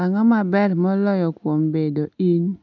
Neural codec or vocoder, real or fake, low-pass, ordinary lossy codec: none; real; 7.2 kHz; none